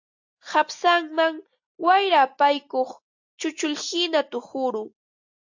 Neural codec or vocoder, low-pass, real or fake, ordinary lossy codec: none; 7.2 kHz; real; AAC, 48 kbps